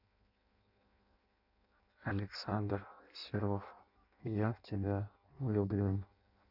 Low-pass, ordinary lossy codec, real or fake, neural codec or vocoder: 5.4 kHz; none; fake; codec, 16 kHz in and 24 kHz out, 0.6 kbps, FireRedTTS-2 codec